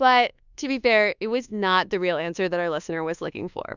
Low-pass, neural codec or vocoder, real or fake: 7.2 kHz; codec, 24 kHz, 1.2 kbps, DualCodec; fake